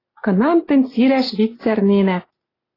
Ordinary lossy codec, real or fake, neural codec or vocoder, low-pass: AAC, 24 kbps; real; none; 5.4 kHz